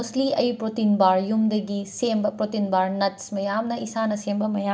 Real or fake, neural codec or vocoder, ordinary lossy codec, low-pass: real; none; none; none